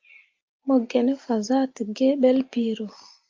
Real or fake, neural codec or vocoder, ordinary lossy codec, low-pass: real; none; Opus, 24 kbps; 7.2 kHz